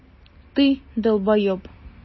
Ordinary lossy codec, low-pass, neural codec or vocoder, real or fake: MP3, 24 kbps; 7.2 kHz; none; real